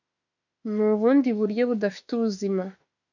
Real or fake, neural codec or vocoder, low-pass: fake; autoencoder, 48 kHz, 32 numbers a frame, DAC-VAE, trained on Japanese speech; 7.2 kHz